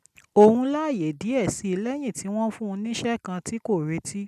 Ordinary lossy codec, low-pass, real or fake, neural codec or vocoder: none; 14.4 kHz; real; none